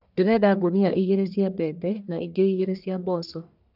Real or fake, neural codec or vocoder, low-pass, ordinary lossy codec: fake; codec, 44.1 kHz, 1.7 kbps, Pupu-Codec; 5.4 kHz; none